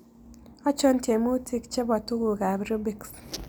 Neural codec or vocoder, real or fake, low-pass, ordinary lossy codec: none; real; none; none